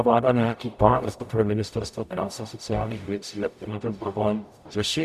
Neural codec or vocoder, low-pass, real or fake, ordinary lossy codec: codec, 44.1 kHz, 0.9 kbps, DAC; 14.4 kHz; fake; MP3, 96 kbps